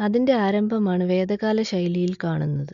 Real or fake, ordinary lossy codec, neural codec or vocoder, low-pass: real; MP3, 64 kbps; none; 7.2 kHz